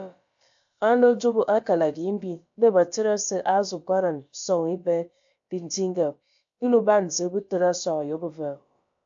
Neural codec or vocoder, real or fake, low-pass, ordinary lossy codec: codec, 16 kHz, about 1 kbps, DyCAST, with the encoder's durations; fake; 7.2 kHz; AAC, 64 kbps